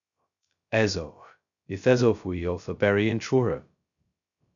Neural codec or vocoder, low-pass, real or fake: codec, 16 kHz, 0.2 kbps, FocalCodec; 7.2 kHz; fake